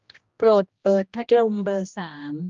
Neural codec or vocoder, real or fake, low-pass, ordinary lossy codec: codec, 16 kHz, 1 kbps, X-Codec, HuBERT features, trained on general audio; fake; 7.2 kHz; Opus, 32 kbps